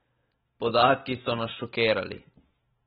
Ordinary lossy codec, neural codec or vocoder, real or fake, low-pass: AAC, 16 kbps; none; real; 19.8 kHz